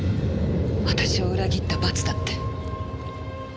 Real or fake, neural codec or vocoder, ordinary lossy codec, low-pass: real; none; none; none